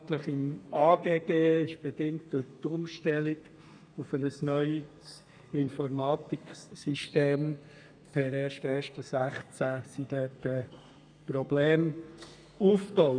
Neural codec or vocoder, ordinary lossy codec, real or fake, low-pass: codec, 32 kHz, 1.9 kbps, SNAC; none; fake; 9.9 kHz